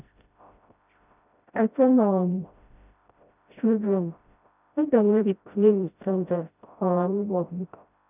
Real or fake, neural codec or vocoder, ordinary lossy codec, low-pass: fake; codec, 16 kHz, 0.5 kbps, FreqCodec, smaller model; none; 3.6 kHz